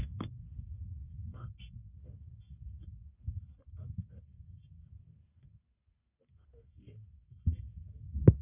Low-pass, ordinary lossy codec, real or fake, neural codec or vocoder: 3.6 kHz; none; fake; codec, 44.1 kHz, 1.7 kbps, Pupu-Codec